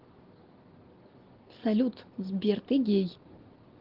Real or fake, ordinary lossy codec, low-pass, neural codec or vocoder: real; Opus, 16 kbps; 5.4 kHz; none